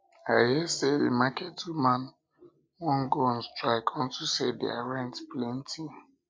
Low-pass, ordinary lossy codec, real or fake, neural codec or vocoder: none; none; real; none